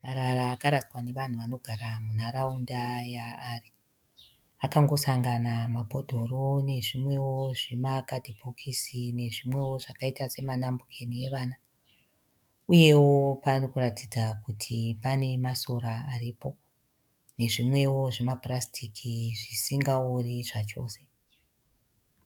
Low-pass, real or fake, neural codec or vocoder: 19.8 kHz; fake; codec, 44.1 kHz, 7.8 kbps, DAC